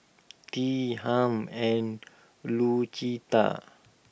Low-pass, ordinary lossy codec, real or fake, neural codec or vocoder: none; none; real; none